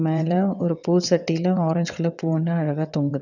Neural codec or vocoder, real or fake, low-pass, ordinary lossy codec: vocoder, 22.05 kHz, 80 mel bands, WaveNeXt; fake; 7.2 kHz; none